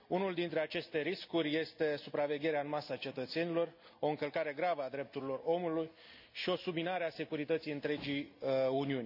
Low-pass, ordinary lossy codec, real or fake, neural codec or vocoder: 5.4 kHz; none; real; none